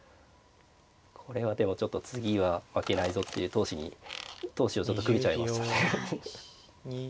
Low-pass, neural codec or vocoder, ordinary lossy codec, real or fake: none; none; none; real